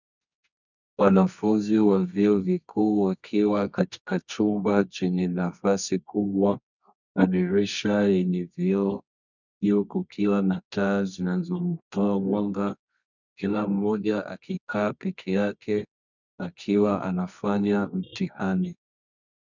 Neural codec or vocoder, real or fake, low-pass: codec, 24 kHz, 0.9 kbps, WavTokenizer, medium music audio release; fake; 7.2 kHz